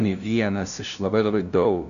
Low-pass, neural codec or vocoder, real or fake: 7.2 kHz; codec, 16 kHz, 0.5 kbps, FunCodec, trained on LibriTTS, 25 frames a second; fake